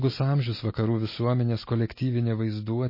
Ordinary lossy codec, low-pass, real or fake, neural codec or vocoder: MP3, 24 kbps; 5.4 kHz; real; none